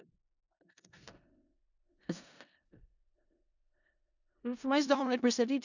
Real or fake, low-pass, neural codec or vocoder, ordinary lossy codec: fake; 7.2 kHz; codec, 16 kHz in and 24 kHz out, 0.4 kbps, LongCat-Audio-Codec, four codebook decoder; none